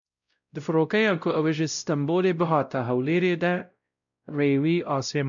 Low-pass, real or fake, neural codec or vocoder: 7.2 kHz; fake; codec, 16 kHz, 0.5 kbps, X-Codec, WavLM features, trained on Multilingual LibriSpeech